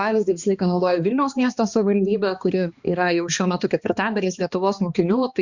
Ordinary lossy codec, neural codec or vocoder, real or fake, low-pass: Opus, 64 kbps; codec, 16 kHz, 2 kbps, X-Codec, HuBERT features, trained on balanced general audio; fake; 7.2 kHz